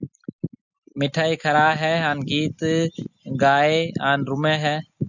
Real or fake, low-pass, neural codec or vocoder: real; 7.2 kHz; none